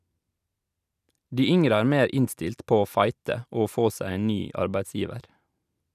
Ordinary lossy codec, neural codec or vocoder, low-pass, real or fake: none; none; 14.4 kHz; real